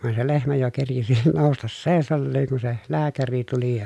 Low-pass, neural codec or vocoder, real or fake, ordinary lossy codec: none; none; real; none